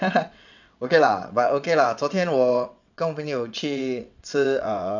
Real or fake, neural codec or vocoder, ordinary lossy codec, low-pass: fake; vocoder, 22.05 kHz, 80 mel bands, WaveNeXt; none; 7.2 kHz